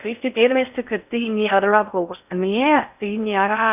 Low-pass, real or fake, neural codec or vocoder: 3.6 kHz; fake; codec, 16 kHz in and 24 kHz out, 0.6 kbps, FocalCodec, streaming, 4096 codes